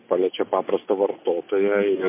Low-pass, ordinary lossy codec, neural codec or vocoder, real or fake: 3.6 kHz; MP3, 24 kbps; none; real